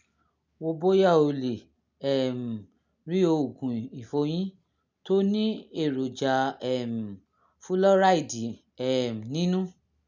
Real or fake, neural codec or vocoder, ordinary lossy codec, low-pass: real; none; none; 7.2 kHz